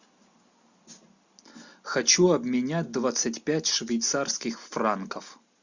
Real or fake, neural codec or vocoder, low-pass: real; none; 7.2 kHz